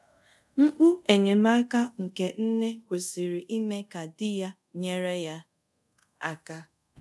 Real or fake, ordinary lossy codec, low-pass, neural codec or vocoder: fake; none; none; codec, 24 kHz, 0.5 kbps, DualCodec